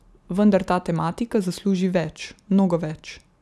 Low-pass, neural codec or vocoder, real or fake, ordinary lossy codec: none; none; real; none